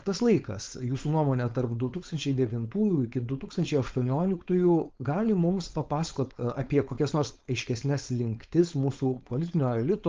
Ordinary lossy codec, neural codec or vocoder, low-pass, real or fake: Opus, 24 kbps; codec, 16 kHz, 4.8 kbps, FACodec; 7.2 kHz; fake